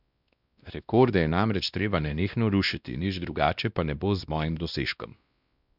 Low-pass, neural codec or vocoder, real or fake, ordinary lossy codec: 5.4 kHz; codec, 16 kHz, 1 kbps, X-Codec, WavLM features, trained on Multilingual LibriSpeech; fake; none